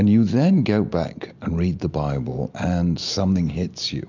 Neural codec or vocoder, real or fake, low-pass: none; real; 7.2 kHz